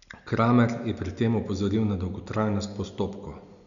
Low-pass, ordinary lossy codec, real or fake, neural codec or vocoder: 7.2 kHz; none; real; none